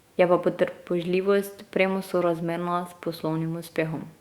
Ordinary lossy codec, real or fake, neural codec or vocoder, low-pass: Opus, 64 kbps; fake; autoencoder, 48 kHz, 128 numbers a frame, DAC-VAE, trained on Japanese speech; 19.8 kHz